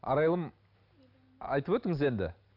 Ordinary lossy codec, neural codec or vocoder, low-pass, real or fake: none; none; 5.4 kHz; real